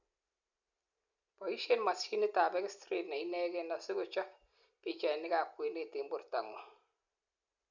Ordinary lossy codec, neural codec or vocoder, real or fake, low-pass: none; none; real; 7.2 kHz